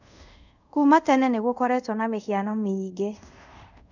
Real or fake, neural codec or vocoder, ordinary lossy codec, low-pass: fake; codec, 24 kHz, 0.5 kbps, DualCodec; none; 7.2 kHz